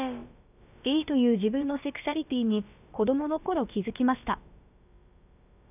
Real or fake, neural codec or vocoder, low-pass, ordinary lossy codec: fake; codec, 16 kHz, about 1 kbps, DyCAST, with the encoder's durations; 3.6 kHz; none